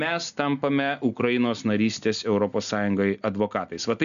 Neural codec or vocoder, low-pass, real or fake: none; 7.2 kHz; real